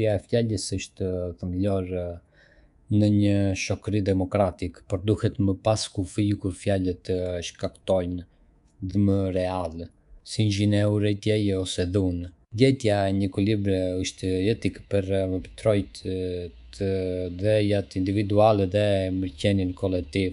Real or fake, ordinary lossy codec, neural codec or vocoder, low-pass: fake; none; codec, 24 kHz, 3.1 kbps, DualCodec; 10.8 kHz